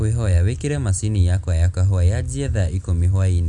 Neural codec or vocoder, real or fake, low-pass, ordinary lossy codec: none; real; 10.8 kHz; none